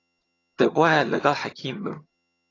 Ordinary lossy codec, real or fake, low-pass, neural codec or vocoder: AAC, 32 kbps; fake; 7.2 kHz; vocoder, 22.05 kHz, 80 mel bands, HiFi-GAN